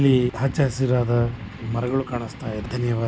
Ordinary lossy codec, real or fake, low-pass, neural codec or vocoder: none; real; none; none